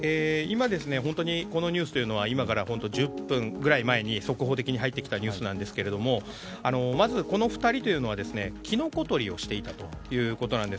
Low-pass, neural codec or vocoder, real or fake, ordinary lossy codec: none; none; real; none